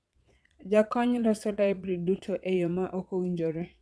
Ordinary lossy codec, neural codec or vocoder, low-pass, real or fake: none; vocoder, 22.05 kHz, 80 mel bands, Vocos; none; fake